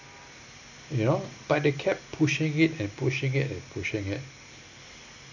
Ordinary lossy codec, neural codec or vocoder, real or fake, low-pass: none; none; real; 7.2 kHz